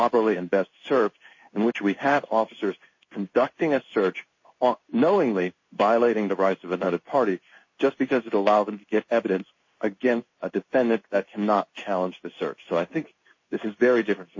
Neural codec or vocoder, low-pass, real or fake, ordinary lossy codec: codec, 16 kHz in and 24 kHz out, 1 kbps, XY-Tokenizer; 7.2 kHz; fake; MP3, 32 kbps